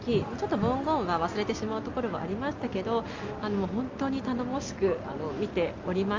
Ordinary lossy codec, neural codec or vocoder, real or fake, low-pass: Opus, 32 kbps; none; real; 7.2 kHz